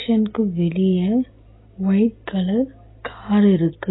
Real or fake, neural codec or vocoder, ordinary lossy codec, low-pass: real; none; AAC, 16 kbps; 7.2 kHz